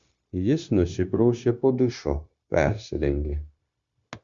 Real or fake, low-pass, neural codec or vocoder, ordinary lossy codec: fake; 7.2 kHz; codec, 16 kHz, 0.9 kbps, LongCat-Audio-Codec; Opus, 64 kbps